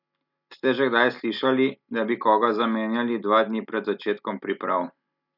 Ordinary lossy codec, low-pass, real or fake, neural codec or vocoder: none; 5.4 kHz; real; none